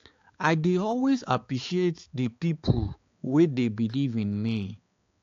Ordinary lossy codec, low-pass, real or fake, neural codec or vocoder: AAC, 48 kbps; 7.2 kHz; fake; codec, 16 kHz, 4 kbps, X-Codec, HuBERT features, trained on balanced general audio